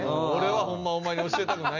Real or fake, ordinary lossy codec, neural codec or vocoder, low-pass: real; none; none; 7.2 kHz